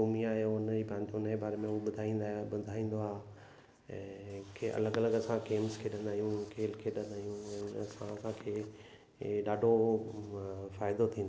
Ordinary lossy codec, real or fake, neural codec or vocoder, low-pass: none; real; none; none